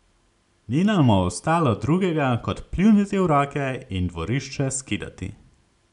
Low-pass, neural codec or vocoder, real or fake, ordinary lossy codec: 10.8 kHz; none; real; none